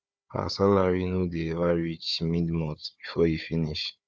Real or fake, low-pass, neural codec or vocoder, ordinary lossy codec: fake; none; codec, 16 kHz, 4 kbps, FunCodec, trained on Chinese and English, 50 frames a second; none